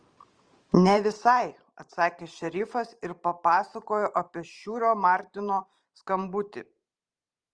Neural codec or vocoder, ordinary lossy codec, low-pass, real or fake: vocoder, 44.1 kHz, 128 mel bands every 512 samples, BigVGAN v2; Opus, 24 kbps; 9.9 kHz; fake